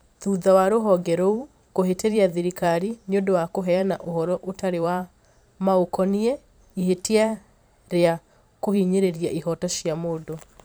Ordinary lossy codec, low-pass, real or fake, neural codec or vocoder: none; none; fake; vocoder, 44.1 kHz, 128 mel bands every 512 samples, BigVGAN v2